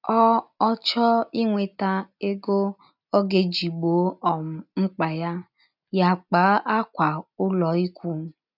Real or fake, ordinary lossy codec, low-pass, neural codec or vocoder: real; none; 5.4 kHz; none